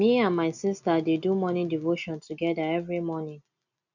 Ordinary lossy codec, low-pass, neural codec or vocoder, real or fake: none; 7.2 kHz; none; real